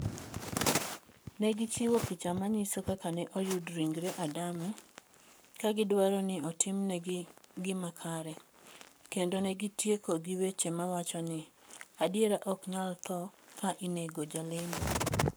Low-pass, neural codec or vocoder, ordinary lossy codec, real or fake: none; codec, 44.1 kHz, 7.8 kbps, Pupu-Codec; none; fake